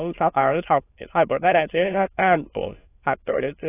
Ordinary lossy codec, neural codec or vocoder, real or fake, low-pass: AAC, 16 kbps; autoencoder, 22.05 kHz, a latent of 192 numbers a frame, VITS, trained on many speakers; fake; 3.6 kHz